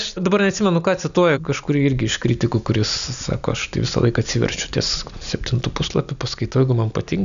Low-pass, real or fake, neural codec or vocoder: 7.2 kHz; real; none